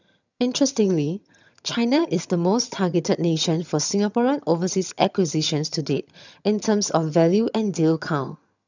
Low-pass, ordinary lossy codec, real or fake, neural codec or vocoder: 7.2 kHz; none; fake; vocoder, 22.05 kHz, 80 mel bands, HiFi-GAN